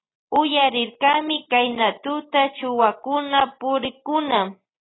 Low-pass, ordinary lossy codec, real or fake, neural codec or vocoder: 7.2 kHz; AAC, 16 kbps; real; none